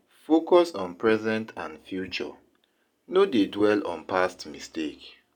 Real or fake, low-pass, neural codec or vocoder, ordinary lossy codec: real; 19.8 kHz; none; none